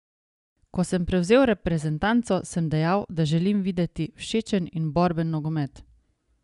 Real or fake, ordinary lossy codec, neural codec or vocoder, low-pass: real; none; none; 10.8 kHz